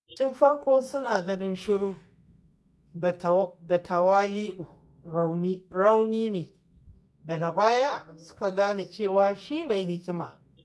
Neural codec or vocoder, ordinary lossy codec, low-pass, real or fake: codec, 24 kHz, 0.9 kbps, WavTokenizer, medium music audio release; none; none; fake